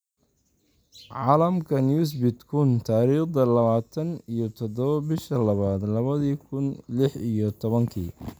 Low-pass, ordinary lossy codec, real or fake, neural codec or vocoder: none; none; real; none